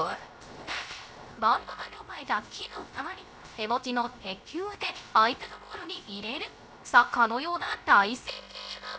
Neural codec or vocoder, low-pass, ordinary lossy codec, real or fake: codec, 16 kHz, 0.3 kbps, FocalCodec; none; none; fake